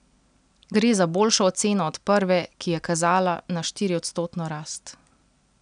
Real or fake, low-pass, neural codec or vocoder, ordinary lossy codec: real; 9.9 kHz; none; none